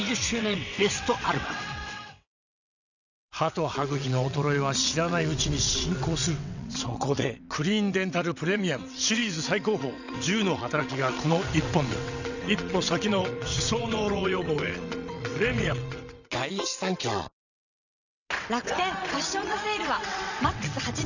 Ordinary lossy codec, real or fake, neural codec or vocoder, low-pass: none; fake; vocoder, 22.05 kHz, 80 mel bands, WaveNeXt; 7.2 kHz